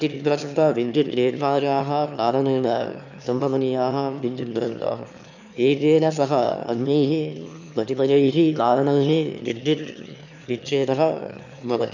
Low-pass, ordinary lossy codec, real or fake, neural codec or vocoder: 7.2 kHz; none; fake; autoencoder, 22.05 kHz, a latent of 192 numbers a frame, VITS, trained on one speaker